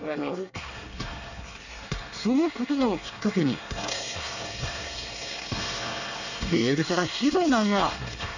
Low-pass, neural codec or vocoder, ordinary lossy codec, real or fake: 7.2 kHz; codec, 24 kHz, 1 kbps, SNAC; none; fake